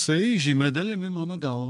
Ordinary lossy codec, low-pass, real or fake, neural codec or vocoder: AAC, 64 kbps; 10.8 kHz; fake; codec, 32 kHz, 1.9 kbps, SNAC